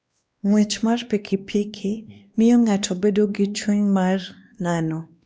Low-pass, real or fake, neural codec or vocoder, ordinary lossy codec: none; fake; codec, 16 kHz, 2 kbps, X-Codec, WavLM features, trained on Multilingual LibriSpeech; none